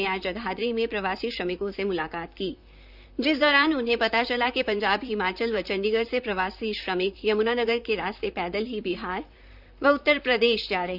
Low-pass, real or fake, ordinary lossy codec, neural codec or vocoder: 5.4 kHz; fake; none; vocoder, 44.1 kHz, 128 mel bands, Pupu-Vocoder